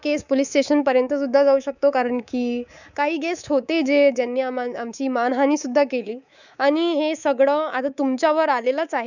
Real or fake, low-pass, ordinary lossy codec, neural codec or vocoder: fake; 7.2 kHz; none; codec, 24 kHz, 3.1 kbps, DualCodec